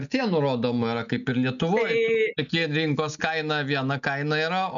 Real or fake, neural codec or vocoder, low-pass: real; none; 7.2 kHz